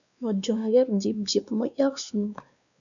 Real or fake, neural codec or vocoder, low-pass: fake; codec, 16 kHz, 2 kbps, X-Codec, WavLM features, trained on Multilingual LibriSpeech; 7.2 kHz